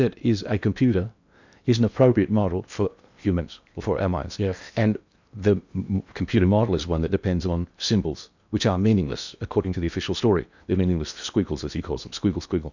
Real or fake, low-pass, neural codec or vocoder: fake; 7.2 kHz; codec, 16 kHz in and 24 kHz out, 0.8 kbps, FocalCodec, streaming, 65536 codes